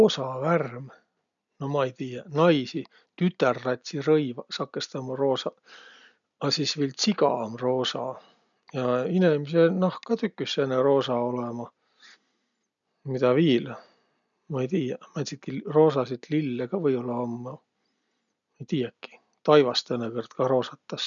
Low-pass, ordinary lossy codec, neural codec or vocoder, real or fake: 7.2 kHz; none; none; real